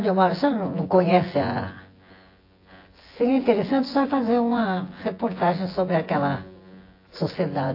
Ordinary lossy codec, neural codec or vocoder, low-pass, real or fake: AAC, 32 kbps; vocoder, 24 kHz, 100 mel bands, Vocos; 5.4 kHz; fake